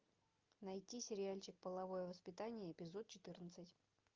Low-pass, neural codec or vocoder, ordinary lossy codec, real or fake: 7.2 kHz; none; Opus, 24 kbps; real